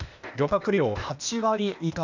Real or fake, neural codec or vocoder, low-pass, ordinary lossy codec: fake; codec, 16 kHz, 0.8 kbps, ZipCodec; 7.2 kHz; none